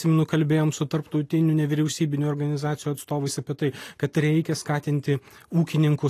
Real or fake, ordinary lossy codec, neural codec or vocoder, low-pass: real; AAC, 48 kbps; none; 14.4 kHz